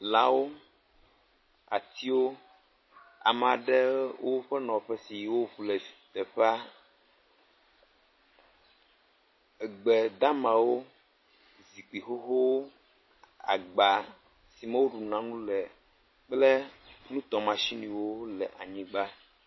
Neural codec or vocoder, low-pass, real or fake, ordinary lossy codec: none; 7.2 kHz; real; MP3, 24 kbps